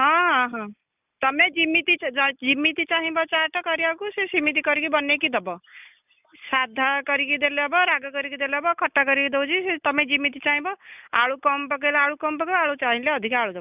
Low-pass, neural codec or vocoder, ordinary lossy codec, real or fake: 3.6 kHz; none; none; real